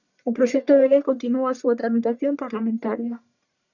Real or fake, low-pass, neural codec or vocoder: fake; 7.2 kHz; codec, 44.1 kHz, 1.7 kbps, Pupu-Codec